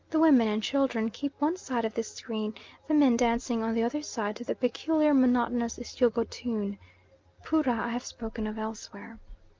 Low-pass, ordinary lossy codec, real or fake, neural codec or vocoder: 7.2 kHz; Opus, 24 kbps; real; none